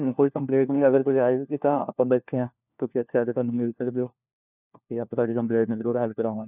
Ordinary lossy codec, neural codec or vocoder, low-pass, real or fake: none; codec, 16 kHz, 1 kbps, FunCodec, trained on LibriTTS, 50 frames a second; 3.6 kHz; fake